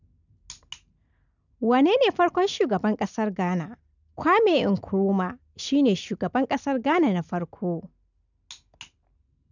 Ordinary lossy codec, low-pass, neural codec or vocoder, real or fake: none; 7.2 kHz; none; real